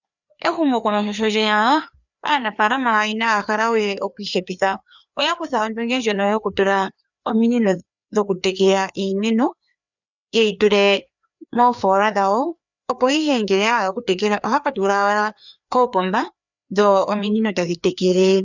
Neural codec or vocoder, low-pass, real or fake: codec, 16 kHz, 2 kbps, FreqCodec, larger model; 7.2 kHz; fake